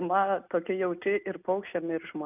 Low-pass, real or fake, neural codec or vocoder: 3.6 kHz; real; none